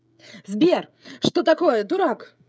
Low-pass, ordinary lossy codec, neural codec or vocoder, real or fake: none; none; codec, 16 kHz, 16 kbps, FreqCodec, smaller model; fake